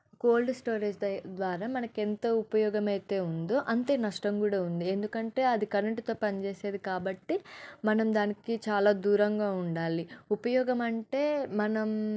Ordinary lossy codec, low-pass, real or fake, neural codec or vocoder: none; none; real; none